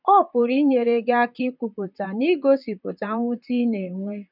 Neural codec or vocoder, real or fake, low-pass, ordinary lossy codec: vocoder, 44.1 kHz, 128 mel bands, Pupu-Vocoder; fake; 5.4 kHz; none